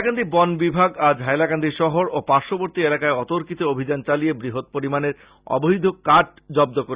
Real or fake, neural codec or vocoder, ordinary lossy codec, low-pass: real; none; Opus, 64 kbps; 3.6 kHz